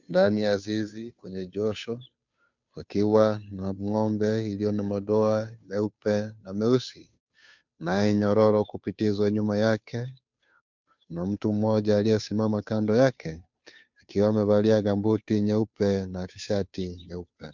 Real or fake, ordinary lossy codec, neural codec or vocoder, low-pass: fake; MP3, 64 kbps; codec, 16 kHz, 2 kbps, FunCodec, trained on Chinese and English, 25 frames a second; 7.2 kHz